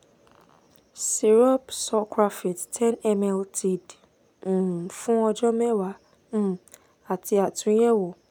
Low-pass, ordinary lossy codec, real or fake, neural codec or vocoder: 19.8 kHz; none; real; none